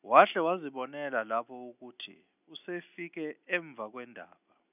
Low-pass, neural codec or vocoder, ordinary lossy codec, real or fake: 3.6 kHz; none; none; real